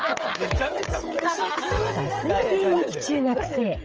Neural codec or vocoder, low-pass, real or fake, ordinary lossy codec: codec, 16 kHz, 16 kbps, FreqCodec, smaller model; 7.2 kHz; fake; Opus, 24 kbps